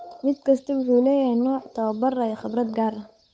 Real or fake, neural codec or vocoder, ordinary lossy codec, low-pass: fake; codec, 16 kHz, 8 kbps, FunCodec, trained on Chinese and English, 25 frames a second; none; none